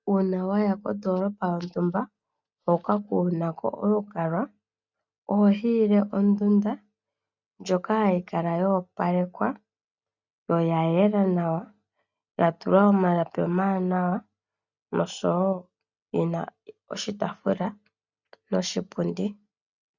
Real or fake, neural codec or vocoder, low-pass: real; none; 7.2 kHz